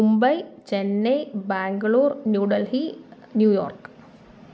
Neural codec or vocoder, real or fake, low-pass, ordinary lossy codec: none; real; none; none